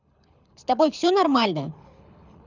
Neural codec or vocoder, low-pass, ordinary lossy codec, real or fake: codec, 24 kHz, 6 kbps, HILCodec; 7.2 kHz; none; fake